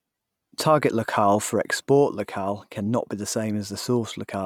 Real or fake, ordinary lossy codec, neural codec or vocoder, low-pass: real; none; none; 19.8 kHz